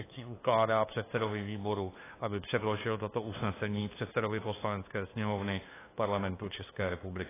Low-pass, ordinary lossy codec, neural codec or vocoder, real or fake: 3.6 kHz; AAC, 16 kbps; codec, 16 kHz, 2 kbps, FunCodec, trained on LibriTTS, 25 frames a second; fake